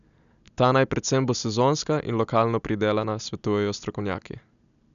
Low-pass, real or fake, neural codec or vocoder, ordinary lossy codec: 7.2 kHz; real; none; none